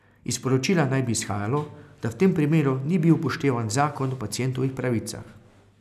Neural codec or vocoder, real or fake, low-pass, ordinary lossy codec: none; real; 14.4 kHz; none